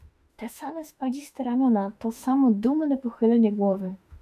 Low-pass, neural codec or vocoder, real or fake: 14.4 kHz; autoencoder, 48 kHz, 32 numbers a frame, DAC-VAE, trained on Japanese speech; fake